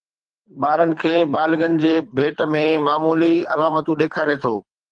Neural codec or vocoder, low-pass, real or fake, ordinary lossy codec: codec, 24 kHz, 3 kbps, HILCodec; 9.9 kHz; fake; Opus, 24 kbps